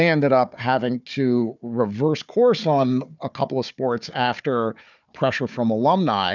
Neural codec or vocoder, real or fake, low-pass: codec, 16 kHz, 4 kbps, FunCodec, trained on Chinese and English, 50 frames a second; fake; 7.2 kHz